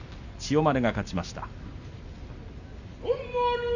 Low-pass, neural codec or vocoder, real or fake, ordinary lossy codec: 7.2 kHz; none; real; none